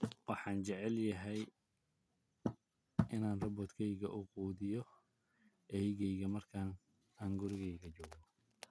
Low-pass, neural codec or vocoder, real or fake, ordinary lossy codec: none; none; real; none